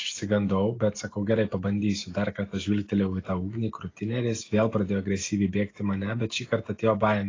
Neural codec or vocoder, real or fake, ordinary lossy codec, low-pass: none; real; AAC, 32 kbps; 7.2 kHz